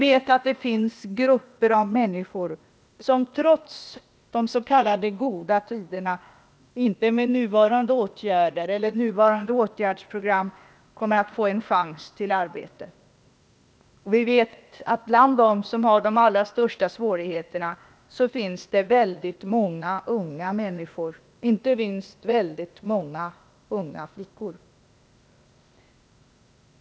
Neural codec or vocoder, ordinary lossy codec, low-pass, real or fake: codec, 16 kHz, 0.8 kbps, ZipCodec; none; none; fake